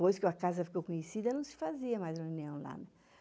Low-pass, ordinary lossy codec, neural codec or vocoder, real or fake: none; none; none; real